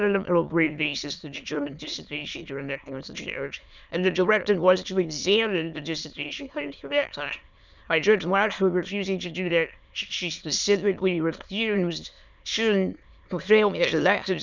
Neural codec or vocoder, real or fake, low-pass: autoencoder, 22.05 kHz, a latent of 192 numbers a frame, VITS, trained on many speakers; fake; 7.2 kHz